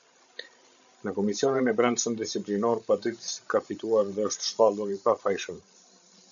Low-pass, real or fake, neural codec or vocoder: 7.2 kHz; fake; codec, 16 kHz, 16 kbps, FreqCodec, larger model